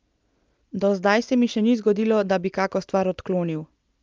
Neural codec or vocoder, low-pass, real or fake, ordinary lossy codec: none; 7.2 kHz; real; Opus, 24 kbps